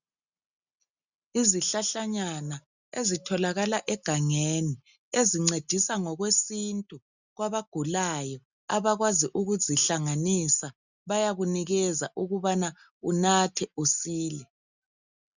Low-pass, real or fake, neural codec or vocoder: 7.2 kHz; real; none